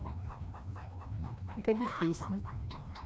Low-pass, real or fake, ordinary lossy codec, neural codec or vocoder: none; fake; none; codec, 16 kHz, 1 kbps, FreqCodec, larger model